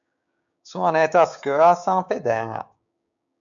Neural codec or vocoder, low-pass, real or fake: codec, 16 kHz, 6 kbps, DAC; 7.2 kHz; fake